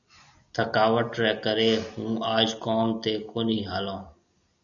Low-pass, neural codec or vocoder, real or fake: 7.2 kHz; none; real